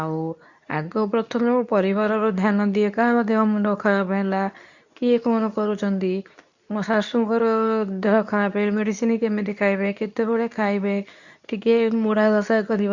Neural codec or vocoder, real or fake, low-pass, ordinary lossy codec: codec, 24 kHz, 0.9 kbps, WavTokenizer, medium speech release version 2; fake; 7.2 kHz; none